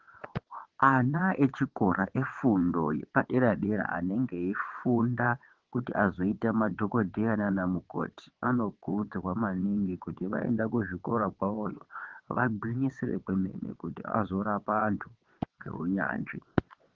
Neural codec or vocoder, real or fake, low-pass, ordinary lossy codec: vocoder, 22.05 kHz, 80 mel bands, WaveNeXt; fake; 7.2 kHz; Opus, 16 kbps